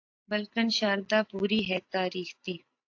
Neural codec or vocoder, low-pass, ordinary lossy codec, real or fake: none; 7.2 kHz; AAC, 48 kbps; real